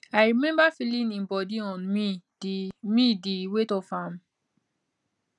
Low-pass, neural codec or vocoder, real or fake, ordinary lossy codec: 10.8 kHz; none; real; none